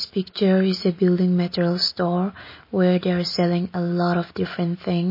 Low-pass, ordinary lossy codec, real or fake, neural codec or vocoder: 5.4 kHz; MP3, 24 kbps; real; none